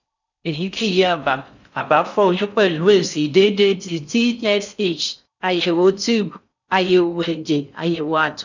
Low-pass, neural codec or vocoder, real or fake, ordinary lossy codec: 7.2 kHz; codec, 16 kHz in and 24 kHz out, 0.6 kbps, FocalCodec, streaming, 4096 codes; fake; none